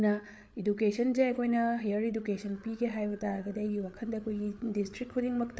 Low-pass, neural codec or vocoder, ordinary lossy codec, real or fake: none; codec, 16 kHz, 8 kbps, FreqCodec, larger model; none; fake